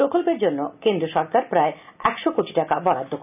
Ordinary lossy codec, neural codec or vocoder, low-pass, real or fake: none; none; 3.6 kHz; real